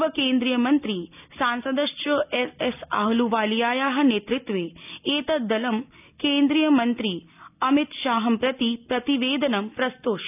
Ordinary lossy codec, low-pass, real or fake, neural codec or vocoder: none; 3.6 kHz; real; none